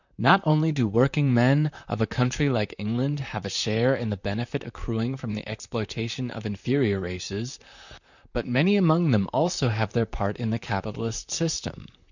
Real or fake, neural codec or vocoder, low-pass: fake; vocoder, 44.1 kHz, 128 mel bands, Pupu-Vocoder; 7.2 kHz